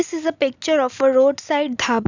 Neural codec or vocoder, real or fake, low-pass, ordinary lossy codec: none; real; 7.2 kHz; none